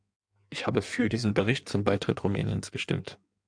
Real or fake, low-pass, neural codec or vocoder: fake; 9.9 kHz; codec, 16 kHz in and 24 kHz out, 1.1 kbps, FireRedTTS-2 codec